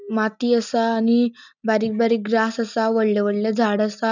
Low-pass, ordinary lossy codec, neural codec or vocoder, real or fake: 7.2 kHz; none; none; real